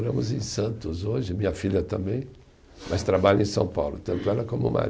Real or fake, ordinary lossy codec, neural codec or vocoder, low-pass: real; none; none; none